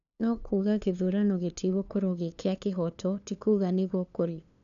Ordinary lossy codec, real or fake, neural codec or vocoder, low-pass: none; fake; codec, 16 kHz, 2 kbps, FunCodec, trained on LibriTTS, 25 frames a second; 7.2 kHz